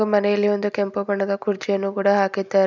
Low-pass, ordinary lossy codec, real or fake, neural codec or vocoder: 7.2 kHz; none; real; none